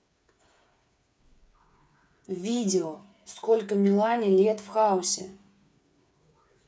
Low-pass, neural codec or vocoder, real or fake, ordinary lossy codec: none; codec, 16 kHz, 8 kbps, FreqCodec, smaller model; fake; none